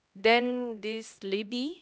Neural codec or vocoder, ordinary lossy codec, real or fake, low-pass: codec, 16 kHz, 1 kbps, X-Codec, HuBERT features, trained on LibriSpeech; none; fake; none